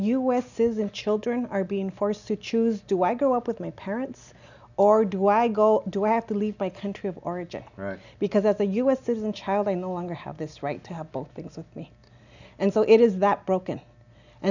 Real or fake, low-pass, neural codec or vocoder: real; 7.2 kHz; none